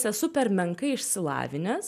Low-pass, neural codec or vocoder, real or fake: 14.4 kHz; none; real